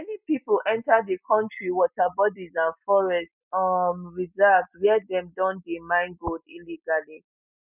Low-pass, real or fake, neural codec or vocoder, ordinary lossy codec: 3.6 kHz; real; none; none